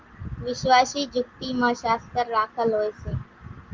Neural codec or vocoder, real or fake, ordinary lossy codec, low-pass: none; real; Opus, 32 kbps; 7.2 kHz